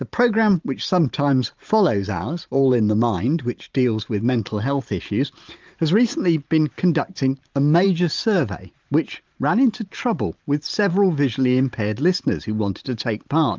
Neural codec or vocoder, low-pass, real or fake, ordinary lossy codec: vocoder, 44.1 kHz, 128 mel bands every 512 samples, BigVGAN v2; 7.2 kHz; fake; Opus, 32 kbps